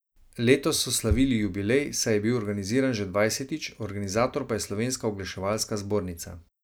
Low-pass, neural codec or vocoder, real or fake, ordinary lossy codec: none; none; real; none